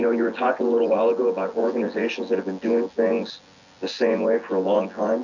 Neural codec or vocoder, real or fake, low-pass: vocoder, 24 kHz, 100 mel bands, Vocos; fake; 7.2 kHz